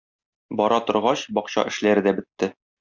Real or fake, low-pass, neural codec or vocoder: real; 7.2 kHz; none